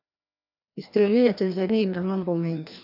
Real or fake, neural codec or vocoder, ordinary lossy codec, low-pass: fake; codec, 16 kHz, 1 kbps, FreqCodec, larger model; AAC, 48 kbps; 5.4 kHz